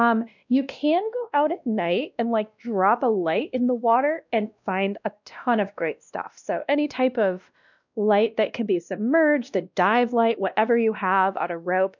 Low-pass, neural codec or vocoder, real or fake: 7.2 kHz; codec, 16 kHz, 1 kbps, X-Codec, WavLM features, trained on Multilingual LibriSpeech; fake